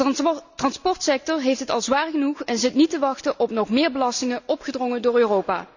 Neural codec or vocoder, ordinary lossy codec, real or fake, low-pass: none; none; real; 7.2 kHz